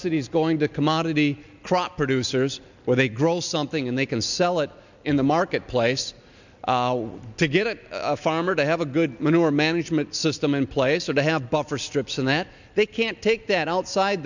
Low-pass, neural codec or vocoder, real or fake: 7.2 kHz; none; real